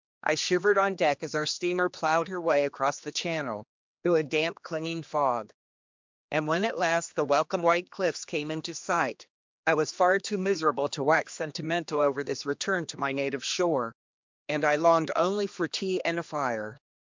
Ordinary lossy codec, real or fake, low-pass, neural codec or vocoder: MP3, 64 kbps; fake; 7.2 kHz; codec, 16 kHz, 2 kbps, X-Codec, HuBERT features, trained on general audio